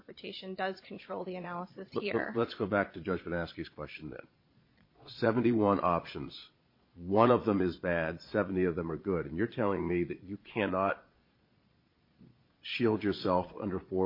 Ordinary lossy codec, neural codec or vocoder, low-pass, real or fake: MP3, 32 kbps; vocoder, 22.05 kHz, 80 mel bands, Vocos; 5.4 kHz; fake